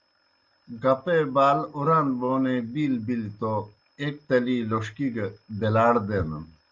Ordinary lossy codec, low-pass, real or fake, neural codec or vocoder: Opus, 32 kbps; 7.2 kHz; real; none